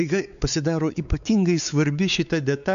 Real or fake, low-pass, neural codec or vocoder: fake; 7.2 kHz; codec, 16 kHz, 4 kbps, X-Codec, WavLM features, trained on Multilingual LibriSpeech